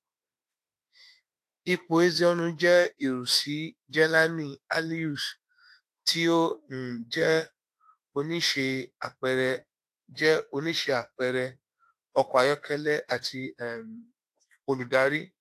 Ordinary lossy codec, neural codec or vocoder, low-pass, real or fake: AAC, 64 kbps; autoencoder, 48 kHz, 32 numbers a frame, DAC-VAE, trained on Japanese speech; 14.4 kHz; fake